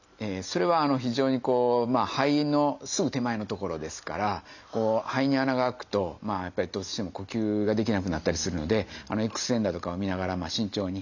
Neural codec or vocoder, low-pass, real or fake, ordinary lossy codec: none; 7.2 kHz; real; none